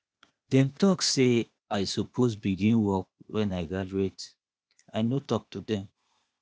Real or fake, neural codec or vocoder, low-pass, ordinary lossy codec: fake; codec, 16 kHz, 0.8 kbps, ZipCodec; none; none